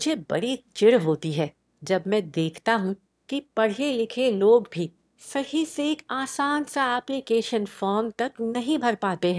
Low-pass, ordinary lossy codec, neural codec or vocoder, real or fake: none; none; autoencoder, 22.05 kHz, a latent of 192 numbers a frame, VITS, trained on one speaker; fake